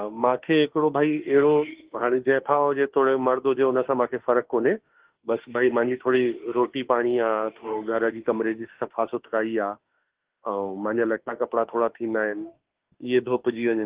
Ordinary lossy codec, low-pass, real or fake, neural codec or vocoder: Opus, 16 kbps; 3.6 kHz; fake; autoencoder, 48 kHz, 32 numbers a frame, DAC-VAE, trained on Japanese speech